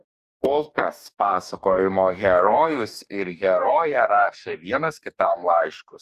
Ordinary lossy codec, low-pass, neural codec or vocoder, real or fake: Opus, 64 kbps; 14.4 kHz; codec, 44.1 kHz, 2.6 kbps, DAC; fake